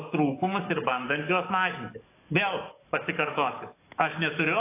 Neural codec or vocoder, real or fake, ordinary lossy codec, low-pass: codec, 44.1 kHz, 7.8 kbps, DAC; fake; AAC, 24 kbps; 3.6 kHz